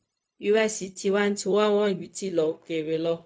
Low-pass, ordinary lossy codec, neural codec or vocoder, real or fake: none; none; codec, 16 kHz, 0.4 kbps, LongCat-Audio-Codec; fake